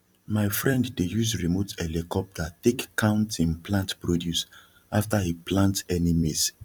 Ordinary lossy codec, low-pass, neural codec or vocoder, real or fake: none; 19.8 kHz; vocoder, 44.1 kHz, 128 mel bands every 256 samples, BigVGAN v2; fake